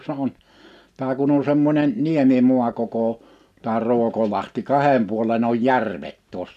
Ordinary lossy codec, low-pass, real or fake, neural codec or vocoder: none; 14.4 kHz; real; none